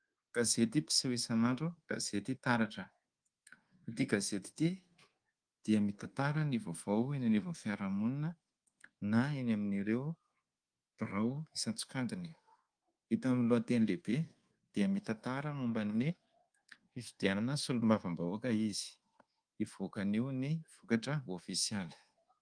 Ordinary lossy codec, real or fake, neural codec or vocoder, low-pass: Opus, 24 kbps; fake; codec, 24 kHz, 1.2 kbps, DualCodec; 9.9 kHz